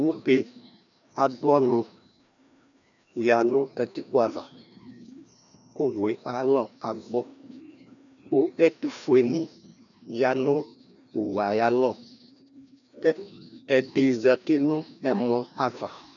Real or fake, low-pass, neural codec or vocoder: fake; 7.2 kHz; codec, 16 kHz, 1 kbps, FreqCodec, larger model